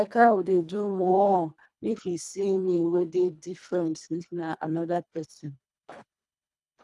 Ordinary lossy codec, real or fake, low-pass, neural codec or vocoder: none; fake; none; codec, 24 kHz, 1.5 kbps, HILCodec